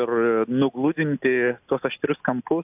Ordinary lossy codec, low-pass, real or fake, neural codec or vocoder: AAC, 32 kbps; 3.6 kHz; real; none